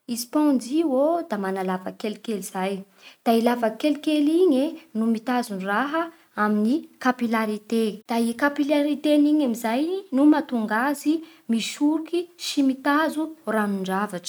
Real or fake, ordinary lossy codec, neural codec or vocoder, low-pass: real; none; none; none